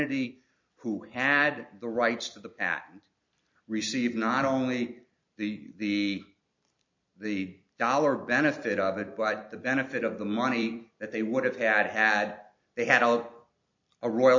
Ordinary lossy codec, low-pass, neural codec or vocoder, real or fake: MP3, 48 kbps; 7.2 kHz; vocoder, 44.1 kHz, 128 mel bands every 256 samples, BigVGAN v2; fake